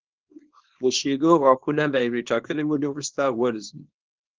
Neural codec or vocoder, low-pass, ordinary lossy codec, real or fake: codec, 24 kHz, 0.9 kbps, WavTokenizer, small release; 7.2 kHz; Opus, 16 kbps; fake